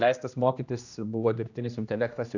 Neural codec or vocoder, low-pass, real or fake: codec, 16 kHz, 1 kbps, X-Codec, HuBERT features, trained on general audio; 7.2 kHz; fake